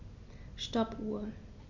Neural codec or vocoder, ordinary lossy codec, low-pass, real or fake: none; Opus, 64 kbps; 7.2 kHz; real